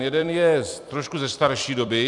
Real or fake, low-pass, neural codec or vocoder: fake; 10.8 kHz; vocoder, 44.1 kHz, 128 mel bands every 256 samples, BigVGAN v2